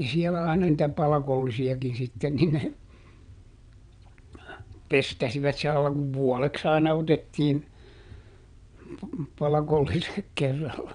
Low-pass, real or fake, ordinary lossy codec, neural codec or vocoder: 9.9 kHz; fake; none; vocoder, 22.05 kHz, 80 mel bands, WaveNeXt